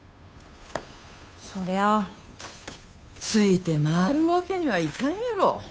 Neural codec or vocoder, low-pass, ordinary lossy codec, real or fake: codec, 16 kHz, 2 kbps, FunCodec, trained on Chinese and English, 25 frames a second; none; none; fake